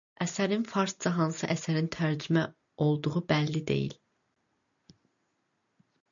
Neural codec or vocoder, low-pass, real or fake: none; 7.2 kHz; real